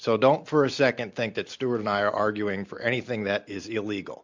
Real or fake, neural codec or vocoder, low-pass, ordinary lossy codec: real; none; 7.2 kHz; MP3, 64 kbps